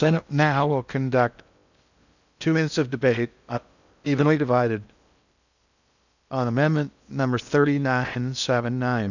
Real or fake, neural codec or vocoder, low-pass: fake; codec, 16 kHz in and 24 kHz out, 0.6 kbps, FocalCodec, streaming, 4096 codes; 7.2 kHz